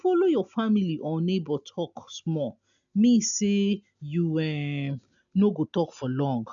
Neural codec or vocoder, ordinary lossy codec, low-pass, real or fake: none; none; 7.2 kHz; real